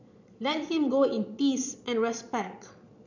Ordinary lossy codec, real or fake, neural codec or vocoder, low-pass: none; fake; vocoder, 22.05 kHz, 80 mel bands, Vocos; 7.2 kHz